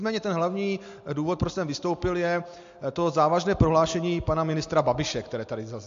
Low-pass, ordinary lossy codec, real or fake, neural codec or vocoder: 7.2 kHz; MP3, 48 kbps; real; none